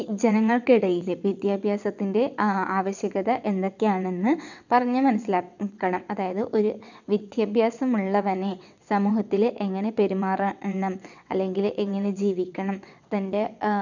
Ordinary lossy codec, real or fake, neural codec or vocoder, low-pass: none; fake; vocoder, 22.05 kHz, 80 mel bands, WaveNeXt; 7.2 kHz